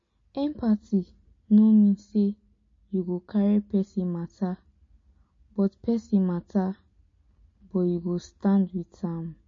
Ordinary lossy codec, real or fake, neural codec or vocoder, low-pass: MP3, 32 kbps; real; none; 7.2 kHz